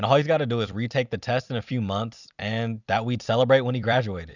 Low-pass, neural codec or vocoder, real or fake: 7.2 kHz; vocoder, 44.1 kHz, 128 mel bands every 256 samples, BigVGAN v2; fake